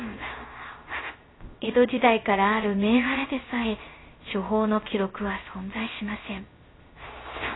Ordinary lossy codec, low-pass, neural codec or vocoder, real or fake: AAC, 16 kbps; 7.2 kHz; codec, 16 kHz, 0.3 kbps, FocalCodec; fake